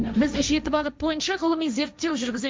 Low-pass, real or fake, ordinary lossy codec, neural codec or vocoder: none; fake; none; codec, 16 kHz, 1.1 kbps, Voila-Tokenizer